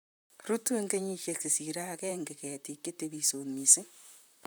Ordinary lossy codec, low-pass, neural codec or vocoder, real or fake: none; none; vocoder, 44.1 kHz, 128 mel bands, Pupu-Vocoder; fake